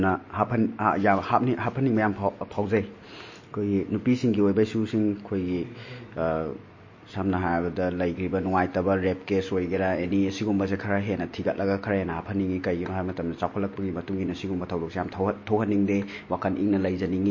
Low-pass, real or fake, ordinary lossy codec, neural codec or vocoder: 7.2 kHz; real; MP3, 32 kbps; none